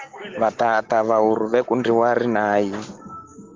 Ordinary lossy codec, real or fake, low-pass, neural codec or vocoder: Opus, 16 kbps; real; 7.2 kHz; none